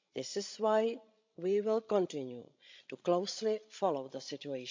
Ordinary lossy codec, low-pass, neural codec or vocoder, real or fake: MP3, 64 kbps; 7.2 kHz; codec, 16 kHz, 16 kbps, FreqCodec, larger model; fake